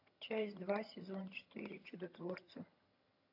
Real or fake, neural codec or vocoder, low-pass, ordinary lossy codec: fake; vocoder, 22.05 kHz, 80 mel bands, HiFi-GAN; 5.4 kHz; AAC, 48 kbps